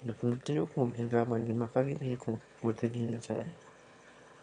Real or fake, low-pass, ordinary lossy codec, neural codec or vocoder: fake; 9.9 kHz; MP3, 64 kbps; autoencoder, 22.05 kHz, a latent of 192 numbers a frame, VITS, trained on one speaker